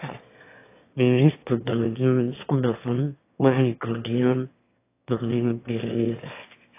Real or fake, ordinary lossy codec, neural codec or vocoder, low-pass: fake; AAC, 24 kbps; autoencoder, 22.05 kHz, a latent of 192 numbers a frame, VITS, trained on one speaker; 3.6 kHz